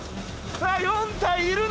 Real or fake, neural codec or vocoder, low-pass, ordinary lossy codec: real; none; none; none